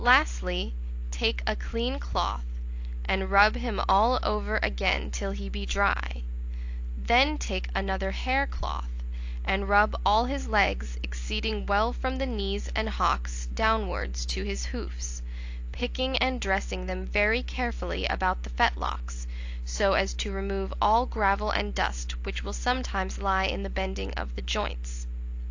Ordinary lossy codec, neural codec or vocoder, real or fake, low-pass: AAC, 48 kbps; none; real; 7.2 kHz